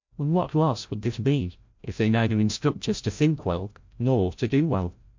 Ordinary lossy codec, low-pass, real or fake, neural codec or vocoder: MP3, 48 kbps; 7.2 kHz; fake; codec, 16 kHz, 0.5 kbps, FreqCodec, larger model